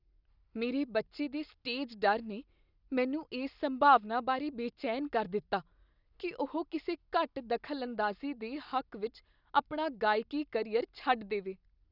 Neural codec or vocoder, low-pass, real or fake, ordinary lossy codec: none; 5.4 kHz; real; none